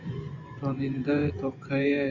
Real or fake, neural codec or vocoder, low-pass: fake; vocoder, 24 kHz, 100 mel bands, Vocos; 7.2 kHz